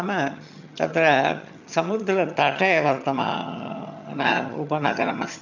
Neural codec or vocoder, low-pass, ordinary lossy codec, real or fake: vocoder, 22.05 kHz, 80 mel bands, HiFi-GAN; 7.2 kHz; none; fake